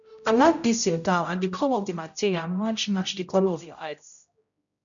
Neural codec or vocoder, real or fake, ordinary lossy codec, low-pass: codec, 16 kHz, 0.5 kbps, X-Codec, HuBERT features, trained on general audio; fake; none; 7.2 kHz